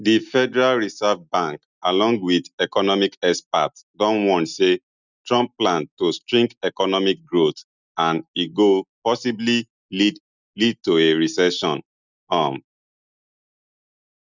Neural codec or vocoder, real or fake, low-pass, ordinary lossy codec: none; real; 7.2 kHz; none